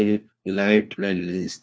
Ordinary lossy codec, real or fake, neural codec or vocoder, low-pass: none; fake; codec, 16 kHz, 1 kbps, FunCodec, trained on LibriTTS, 50 frames a second; none